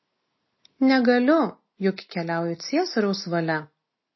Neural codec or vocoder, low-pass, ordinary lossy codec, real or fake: none; 7.2 kHz; MP3, 24 kbps; real